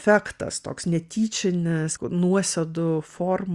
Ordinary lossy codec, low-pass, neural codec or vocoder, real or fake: Opus, 64 kbps; 10.8 kHz; none; real